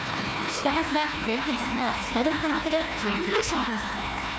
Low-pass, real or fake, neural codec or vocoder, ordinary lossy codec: none; fake; codec, 16 kHz, 1 kbps, FunCodec, trained on Chinese and English, 50 frames a second; none